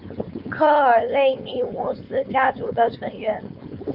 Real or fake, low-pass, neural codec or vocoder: fake; 5.4 kHz; codec, 16 kHz, 4.8 kbps, FACodec